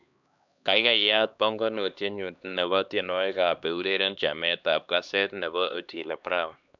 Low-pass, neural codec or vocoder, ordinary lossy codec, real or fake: 7.2 kHz; codec, 16 kHz, 2 kbps, X-Codec, HuBERT features, trained on LibriSpeech; none; fake